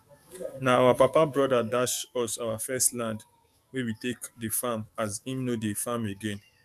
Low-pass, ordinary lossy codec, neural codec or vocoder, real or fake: 14.4 kHz; none; codec, 44.1 kHz, 7.8 kbps, DAC; fake